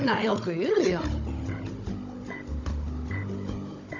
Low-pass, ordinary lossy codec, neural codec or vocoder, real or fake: 7.2 kHz; none; codec, 16 kHz, 16 kbps, FunCodec, trained on Chinese and English, 50 frames a second; fake